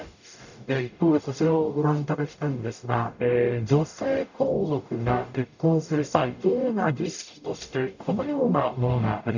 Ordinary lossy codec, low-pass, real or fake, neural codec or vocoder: none; 7.2 kHz; fake; codec, 44.1 kHz, 0.9 kbps, DAC